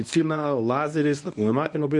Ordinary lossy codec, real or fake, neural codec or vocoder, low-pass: MP3, 96 kbps; fake; codec, 24 kHz, 0.9 kbps, WavTokenizer, medium speech release version 1; 10.8 kHz